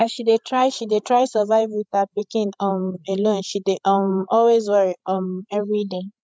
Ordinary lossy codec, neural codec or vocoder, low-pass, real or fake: none; codec, 16 kHz, 16 kbps, FreqCodec, larger model; none; fake